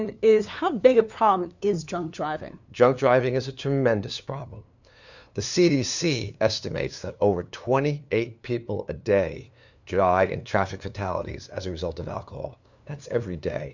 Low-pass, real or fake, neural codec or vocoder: 7.2 kHz; fake; codec, 16 kHz, 2 kbps, FunCodec, trained on LibriTTS, 25 frames a second